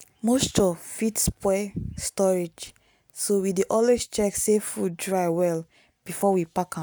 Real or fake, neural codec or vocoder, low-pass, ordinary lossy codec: real; none; none; none